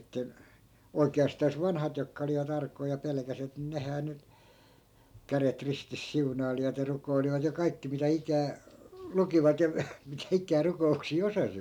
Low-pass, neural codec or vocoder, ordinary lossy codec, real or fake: 19.8 kHz; none; none; real